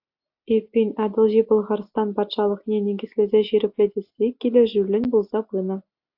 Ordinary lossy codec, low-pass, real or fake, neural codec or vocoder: AAC, 48 kbps; 5.4 kHz; real; none